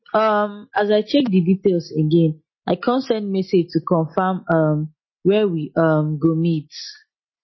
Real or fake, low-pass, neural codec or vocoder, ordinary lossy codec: real; 7.2 kHz; none; MP3, 24 kbps